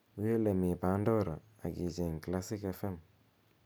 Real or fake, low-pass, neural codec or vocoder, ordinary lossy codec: real; none; none; none